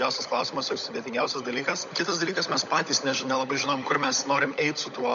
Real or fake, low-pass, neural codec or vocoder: fake; 7.2 kHz; codec, 16 kHz, 16 kbps, FunCodec, trained on LibriTTS, 50 frames a second